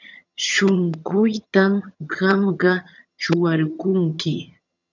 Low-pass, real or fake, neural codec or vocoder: 7.2 kHz; fake; vocoder, 22.05 kHz, 80 mel bands, HiFi-GAN